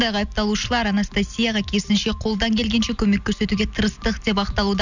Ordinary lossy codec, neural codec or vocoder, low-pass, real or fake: none; none; 7.2 kHz; real